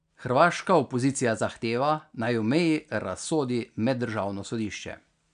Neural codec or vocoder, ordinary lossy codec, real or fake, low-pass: none; none; real; 9.9 kHz